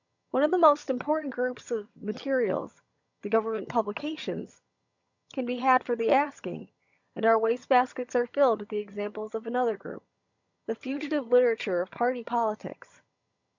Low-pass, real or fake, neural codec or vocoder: 7.2 kHz; fake; vocoder, 22.05 kHz, 80 mel bands, HiFi-GAN